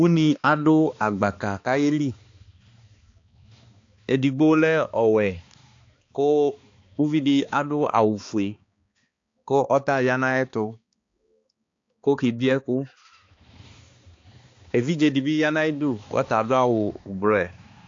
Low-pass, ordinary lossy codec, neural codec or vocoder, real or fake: 7.2 kHz; AAC, 48 kbps; codec, 16 kHz, 2 kbps, X-Codec, HuBERT features, trained on balanced general audio; fake